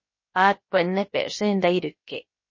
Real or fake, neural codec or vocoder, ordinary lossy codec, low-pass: fake; codec, 16 kHz, about 1 kbps, DyCAST, with the encoder's durations; MP3, 32 kbps; 7.2 kHz